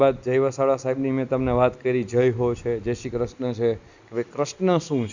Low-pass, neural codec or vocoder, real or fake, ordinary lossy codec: 7.2 kHz; none; real; Opus, 64 kbps